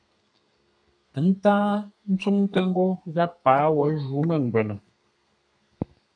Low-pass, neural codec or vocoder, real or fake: 9.9 kHz; codec, 32 kHz, 1.9 kbps, SNAC; fake